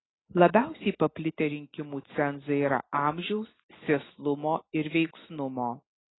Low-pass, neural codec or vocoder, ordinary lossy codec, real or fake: 7.2 kHz; none; AAC, 16 kbps; real